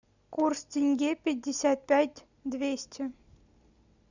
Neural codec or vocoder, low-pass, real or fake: none; 7.2 kHz; real